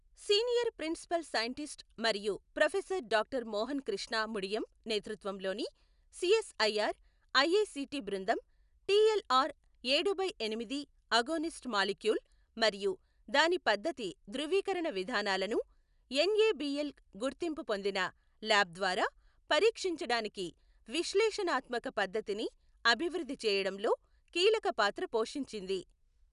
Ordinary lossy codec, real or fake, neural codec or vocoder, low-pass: none; real; none; 10.8 kHz